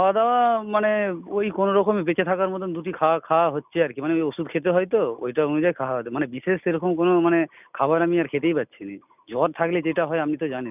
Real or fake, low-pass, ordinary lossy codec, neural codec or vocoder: real; 3.6 kHz; none; none